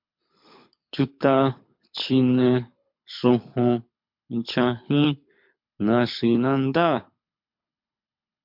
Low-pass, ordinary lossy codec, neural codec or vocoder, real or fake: 5.4 kHz; MP3, 48 kbps; codec, 24 kHz, 6 kbps, HILCodec; fake